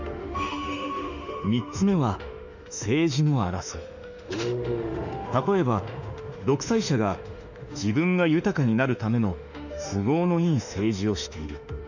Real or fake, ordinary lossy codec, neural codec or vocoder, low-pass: fake; none; autoencoder, 48 kHz, 32 numbers a frame, DAC-VAE, trained on Japanese speech; 7.2 kHz